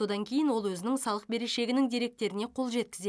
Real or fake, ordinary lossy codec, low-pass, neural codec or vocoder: real; none; none; none